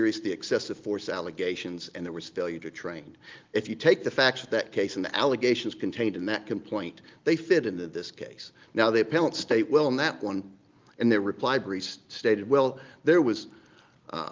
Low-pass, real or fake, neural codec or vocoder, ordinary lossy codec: 7.2 kHz; real; none; Opus, 32 kbps